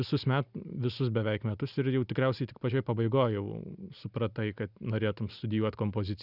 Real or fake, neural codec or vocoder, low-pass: real; none; 5.4 kHz